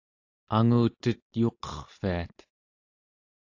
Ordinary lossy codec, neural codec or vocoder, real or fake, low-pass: AAC, 48 kbps; none; real; 7.2 kHz